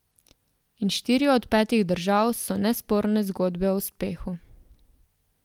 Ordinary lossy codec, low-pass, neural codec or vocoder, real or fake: Opus, 32 kbps; 19.8 kHz; none; real